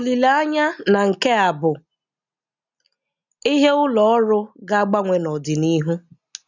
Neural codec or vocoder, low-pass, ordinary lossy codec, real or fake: none; 7.2 kHz; none; real